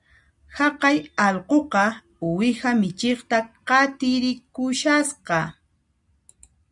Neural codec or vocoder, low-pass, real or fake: none; 10.8 kHz; real